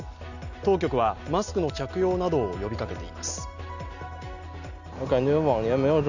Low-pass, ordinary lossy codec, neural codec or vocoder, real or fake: 7.2 kHz; none; none; real